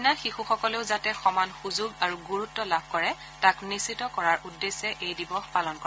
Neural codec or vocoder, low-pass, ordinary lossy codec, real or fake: none; none; none; real